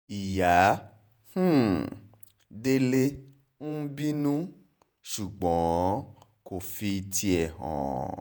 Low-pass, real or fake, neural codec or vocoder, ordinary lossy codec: none; fake; vocoder, 48 kHz, 128 mel bands, Vocos; none